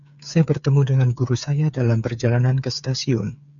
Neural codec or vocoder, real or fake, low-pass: codec, 16 kHz, 8 kbps, FreqCodec, smaller model; fake; 7.2 kHz